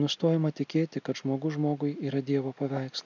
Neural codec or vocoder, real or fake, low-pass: none; real; 7.2 kHz